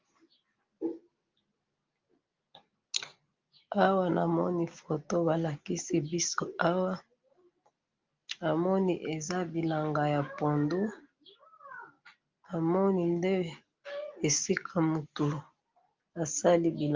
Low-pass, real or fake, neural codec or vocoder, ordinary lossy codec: 7.2 kHz; real; none; Opus, 24 kbps